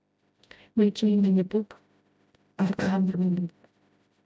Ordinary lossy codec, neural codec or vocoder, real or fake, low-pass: none; codec, 16 kHz, 0.5 kbps, FreqCodec, smaller model; fake; none